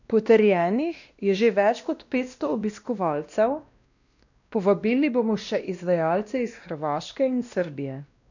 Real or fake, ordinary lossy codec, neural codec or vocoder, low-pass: fake; none; codec, 16 kHz, 1 kbps, X-Codec, WavLM features, trained on Multilingual LibriSpeech; 7.2 kHz